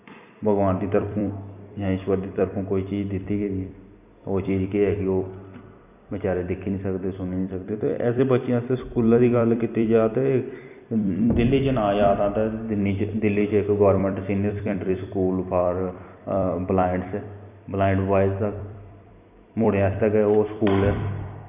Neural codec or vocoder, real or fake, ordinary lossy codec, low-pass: vocoder, 44.1 kHz, 128 mel bands every 256 samples, BigVGAN v2; fake; none; 3.6 kHz